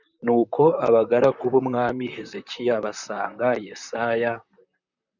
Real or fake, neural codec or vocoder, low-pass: fake; vocoder, 44.1 kHz, 128 mel bands, Pupu-Vocoder; 7.2 kHz